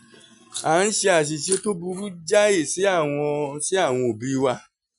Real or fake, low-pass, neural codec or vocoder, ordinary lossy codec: real; 10.8 kHz; none; none